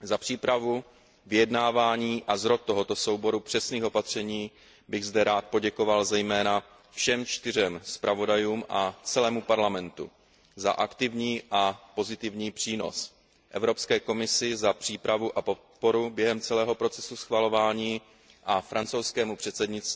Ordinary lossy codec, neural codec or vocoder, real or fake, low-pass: none; none; real; none